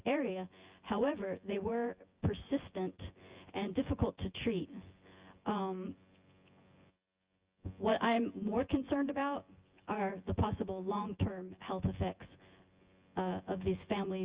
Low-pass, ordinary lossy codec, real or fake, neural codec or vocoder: 3.6 kHz; Opus, 32 kbps; fake; vocoder, 24 kHz, 100 mel bands, Vocos